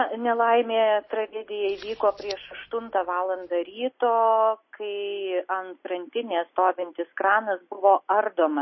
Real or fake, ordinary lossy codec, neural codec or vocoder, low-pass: real; MP3, 24 kbps; none; 7.2 kHz